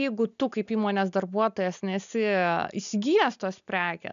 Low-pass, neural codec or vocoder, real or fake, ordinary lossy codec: 7.2 kHz; none; real; MP3, 96 kbps